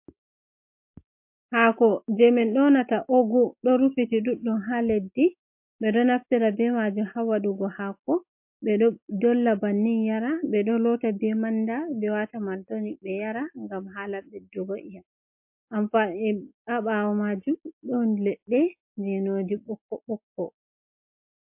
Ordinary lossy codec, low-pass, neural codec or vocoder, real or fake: MP3, 32 kbps; 3.6 kHz; none; real